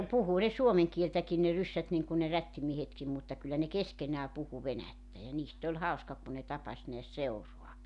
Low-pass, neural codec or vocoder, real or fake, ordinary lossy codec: 10.8 kHz; none; real; none